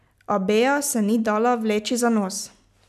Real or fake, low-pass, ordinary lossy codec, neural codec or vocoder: real; 14.4 kHz; none; none